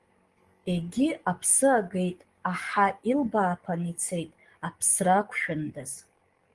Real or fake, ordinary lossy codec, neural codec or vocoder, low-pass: fake; Opus, 24 kbps; codec, 44.1 kHz, 7.8 kbps, DAC; 10.8 kHz